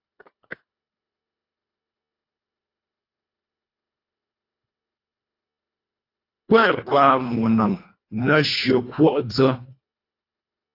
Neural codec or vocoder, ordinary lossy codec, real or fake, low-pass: codec, 24 kHz, 1.5 kbps, HILCodec; AAC, 32 kbps; fake; 5.4 kHz